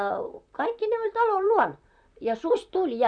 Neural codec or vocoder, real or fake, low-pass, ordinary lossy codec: vocoder, 44.1 kHz, 128 mel bands, Pupu-Vocoder; fake; 9.9 kHz; none